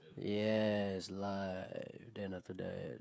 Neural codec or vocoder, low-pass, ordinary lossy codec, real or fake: codec, 16 kHz, 16 kbps, FreqCodec, smaller model; none; none; fake